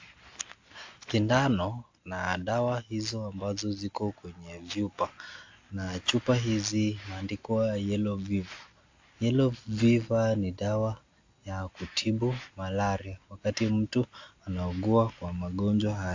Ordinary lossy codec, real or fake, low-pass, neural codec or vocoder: AAC, 48 kbps; real; 7.2 kHz; none